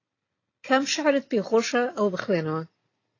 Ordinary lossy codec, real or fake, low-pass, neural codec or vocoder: AAC, 32 kbps; real; 7.2 kHz; none